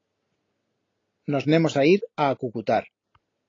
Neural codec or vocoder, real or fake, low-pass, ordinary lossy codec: none; real; 7.2 kHz; AAC, 32 kbps